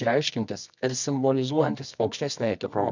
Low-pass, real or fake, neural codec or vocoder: 7.2 kHz; fake; codec, 24 kHz, 0.9 kbps, WavTokenizer, medium music audio release